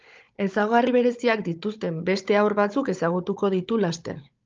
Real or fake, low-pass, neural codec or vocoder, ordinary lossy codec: fake; 7.2 kHz; codec, 16 kHz, 16 kbps, FunCodec, trained on Chinese and English, 50 frames a second; Opus, 24 kbps